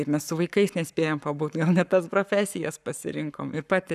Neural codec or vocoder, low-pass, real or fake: codec, 44.1 kHz, 7.8 kbps, Pupu-Codec; 14.4 kHz; fake